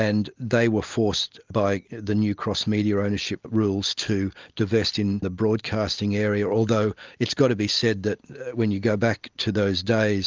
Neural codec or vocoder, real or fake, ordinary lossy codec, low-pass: none; real; Opus, 24 kbps; 7.2 kHz